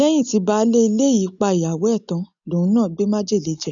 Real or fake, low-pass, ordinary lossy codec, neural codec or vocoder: real; 7.2 kHz; none; none